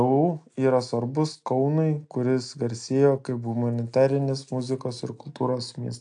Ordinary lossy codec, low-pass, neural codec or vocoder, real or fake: AAC, 64 kbps; 9.9 kHz; none; real